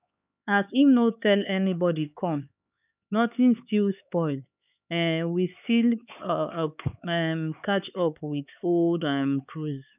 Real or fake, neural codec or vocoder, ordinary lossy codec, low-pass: fake; codec, 16 kHz, 4 kbps, X-Codec, HuBERT features, trained on LibriSpeech; none; 3.6 kHz